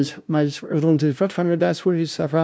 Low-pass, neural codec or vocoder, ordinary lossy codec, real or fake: none; codec, 16 kHz, 0.5 kbps, FunCodec, trained on LibriTTS, 25 frames a second; none; fake